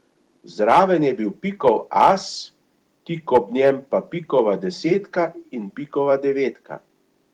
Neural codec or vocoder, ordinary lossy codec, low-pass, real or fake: vocoder, 44.1 kHz, 128 mel bands every 256 samples, BigVGAN v2; Opus, 24 kbps; 19.8 kHz; fake